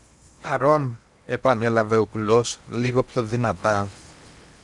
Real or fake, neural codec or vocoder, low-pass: fake; codec, 16 kHz in and 24 kHz out, 0.8 kbps, FocalCodec, streaming, 65536 codes; 10.8 kHz